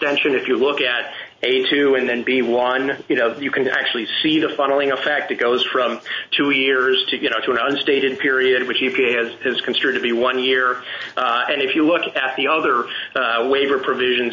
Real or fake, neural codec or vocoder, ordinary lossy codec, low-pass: real; none; MP3, 32 kbps; 7.2 kHz